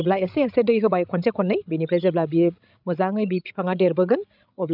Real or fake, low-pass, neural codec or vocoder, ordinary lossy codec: fake; 5.4 kHz; codec, 16 kHz, 16 kbps, FreqCodec, larger model; none